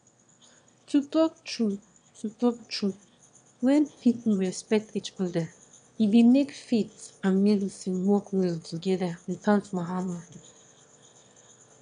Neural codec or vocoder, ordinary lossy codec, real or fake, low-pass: autoencoder, 22.05 kHz, a latent of 192 numbers a frame, VITS, trained on one speaker; none; fake; 9.9 kHz